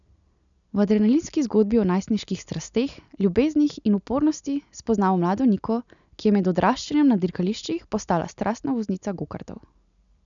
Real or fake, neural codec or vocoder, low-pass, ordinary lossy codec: real; none; 7.2 kHz; none